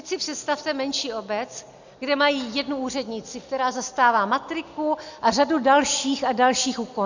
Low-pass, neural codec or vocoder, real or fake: 7.2 kHz; none; real